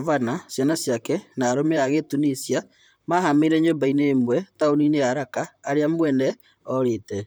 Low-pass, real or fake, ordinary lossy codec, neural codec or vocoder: none; fake; none; vocoder, 44.1 kHz, 128 mel bands, Pupu-Vocoder